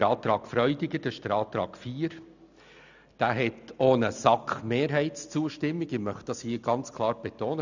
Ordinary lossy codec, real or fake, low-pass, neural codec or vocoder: none; real; 7.2 kHz; none